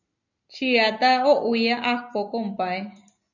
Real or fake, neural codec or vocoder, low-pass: real; none; 7.2 kHz